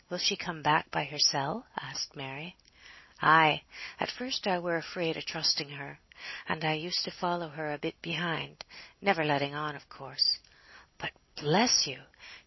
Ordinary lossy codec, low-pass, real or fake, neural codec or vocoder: MP3, 24 kbps; 7.2 kHz; real; none